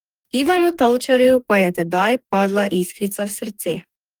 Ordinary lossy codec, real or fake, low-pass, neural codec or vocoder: Opus, 32 kbps; fake; 19.8 kHz; codec, 44.1 kHz, 2.6 kbps, DAC